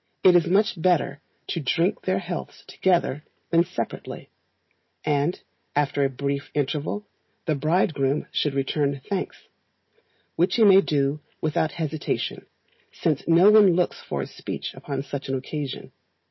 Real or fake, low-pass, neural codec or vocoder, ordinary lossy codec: real; 7.2 kHz; none; MP3, 24 kbps